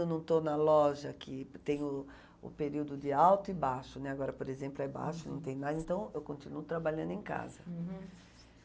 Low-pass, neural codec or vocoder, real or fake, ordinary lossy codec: none; none; real; none